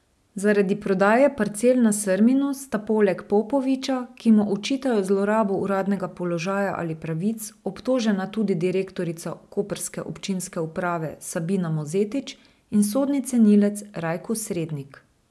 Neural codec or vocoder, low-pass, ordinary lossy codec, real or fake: none; none; none; real